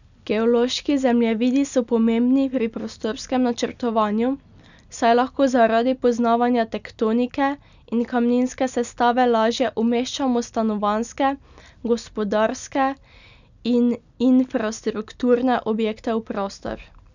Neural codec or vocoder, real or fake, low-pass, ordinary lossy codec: none; real; 7.2 kHz; none